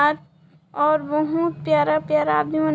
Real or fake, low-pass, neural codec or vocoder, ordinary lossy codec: real; none; none; none